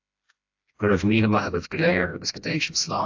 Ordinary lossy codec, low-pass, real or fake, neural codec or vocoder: AAC, 48 kbps; 7.2 kHz; fake; codec, 16 kHz, 1 kbps, FreqCodec, smaller model